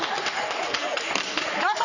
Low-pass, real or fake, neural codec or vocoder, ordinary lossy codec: 7.2 kHz; fake; codec, 44.1 kHz, 3.4 kbps, Pupu-Codec; none